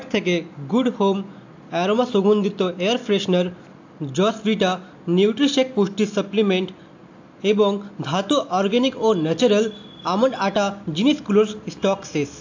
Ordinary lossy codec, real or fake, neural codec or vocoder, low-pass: AAC, 48 kbps; real; none; 7.2 kHz